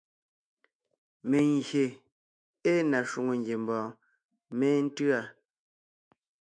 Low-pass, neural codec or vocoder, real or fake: 9.9 kHz; autoencoder, 48 kHz, 128 numbers a frame, DAC-VAE, trained on Japanese speech; fake